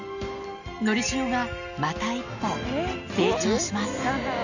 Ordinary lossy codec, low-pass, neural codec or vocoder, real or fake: none; 7.2 kHz; none; real